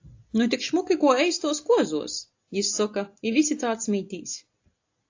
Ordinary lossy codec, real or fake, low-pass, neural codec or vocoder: AAC, 48 kbps; real; 7.2 kHz; none